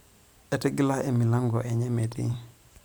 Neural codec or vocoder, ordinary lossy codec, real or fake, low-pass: vocoder, 44.1 kHz, 128 mel bands every 512 samples, BigVGAN v2; none; fake; none